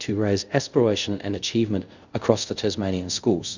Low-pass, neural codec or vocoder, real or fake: 7.2 kHz; codec, 24 kHz, 0.5 kbps, DualCodec; fake